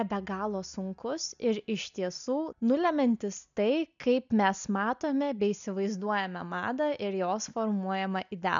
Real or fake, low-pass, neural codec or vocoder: real; 7.2 kHz; none